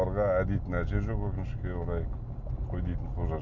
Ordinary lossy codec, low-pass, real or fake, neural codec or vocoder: none; 7.2 kHz; real; none